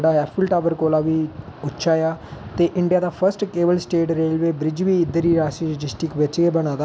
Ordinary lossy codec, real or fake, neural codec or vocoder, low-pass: none; real; none; none